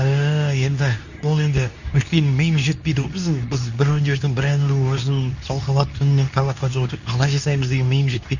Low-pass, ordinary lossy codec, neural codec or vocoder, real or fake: 7.2 kHz; none; codec, 24 kHz, 0.9 kbps, WavTokenizer, medium speech release version 2; fake